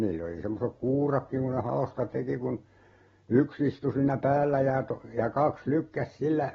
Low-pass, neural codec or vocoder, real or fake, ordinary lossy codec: 7.2 kHz; none; real; AAC, 24 kbps